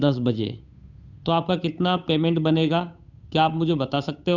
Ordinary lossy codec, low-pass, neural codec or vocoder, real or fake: none; 7.2 kHz; codec, 16 kHz, 8 kbps, FunCodec, trained on Chinese and English, 25 frames a second; fake